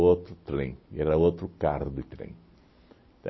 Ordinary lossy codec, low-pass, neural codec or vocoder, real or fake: MP3, 24 kbps; 7.2 kHz; none; real